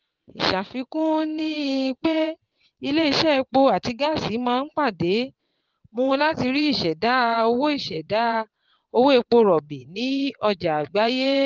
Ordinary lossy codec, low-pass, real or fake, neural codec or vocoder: Opus, 32 kbps; 7.2 kHz; fake; vocoder, 22.05 kHz, 80 mel bands, WaveNeXt